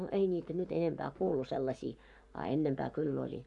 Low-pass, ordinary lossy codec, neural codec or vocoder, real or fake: 10.8 kHz; none; codec, 44.1 kHz, 7.8 kbps, Pupu-Codec; fake